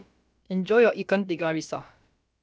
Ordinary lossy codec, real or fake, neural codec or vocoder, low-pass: none; fake; codec, 16 kHz, about 1 kbps, DyCAST, with the encoder's durations; none